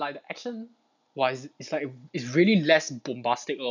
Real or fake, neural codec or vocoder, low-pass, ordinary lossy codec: real; none; 7.2 kHz; none